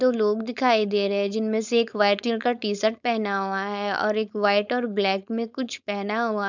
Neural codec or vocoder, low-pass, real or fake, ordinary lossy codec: codec, 16 kHz, 4.8 kbps, FACodec; 7.2 kHz; fake; none